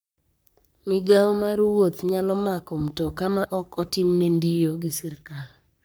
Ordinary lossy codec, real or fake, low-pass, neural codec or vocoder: none; fake; none; codec, 44.1 kHz, 3.4 kbps, Pupu-Codec